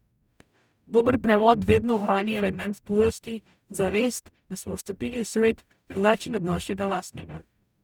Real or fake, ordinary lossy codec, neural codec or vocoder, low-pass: fake; none; codec, 44.1 kHz, 0.9 kbps, DAC; 19.8 kHz